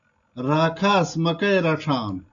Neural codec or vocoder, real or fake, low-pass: none; real; 7.2 kHz